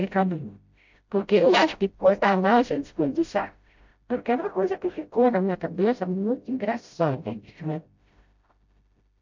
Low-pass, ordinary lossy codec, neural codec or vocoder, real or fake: 7.2 kHz; MP3, 48 kbps; codec, 16 kHz, 0.5 kbps, FreqCodec, smaller model; fake